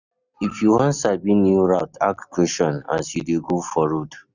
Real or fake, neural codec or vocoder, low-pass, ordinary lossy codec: real; none; 7.2 kHz; none